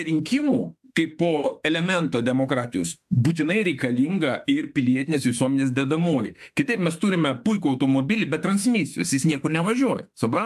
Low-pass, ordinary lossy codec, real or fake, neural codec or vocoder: 14.4 kHz; AAC, 96 kbps; fake; autoencoder, 48 kHz, 32 numbers a frame, DAC-VAE, trained on Japanese speech